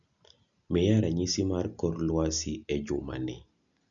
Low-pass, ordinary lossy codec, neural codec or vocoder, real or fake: 7.2 kHz; none; none; real